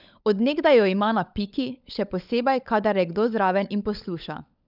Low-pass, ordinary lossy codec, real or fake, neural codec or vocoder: 5.4 kHz; none; fake; codec, 16 kHz, 16 kbps, FunCodec, trained on LibriTTS, 50 frames a second